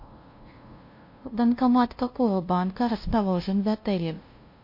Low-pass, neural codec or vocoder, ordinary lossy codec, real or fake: 5.4 kHz; codec, 16 kHz, 0.5 kbps, FunCodec, trained on LibriTTS, 25 frames a second; MP3, 32 kbps; fake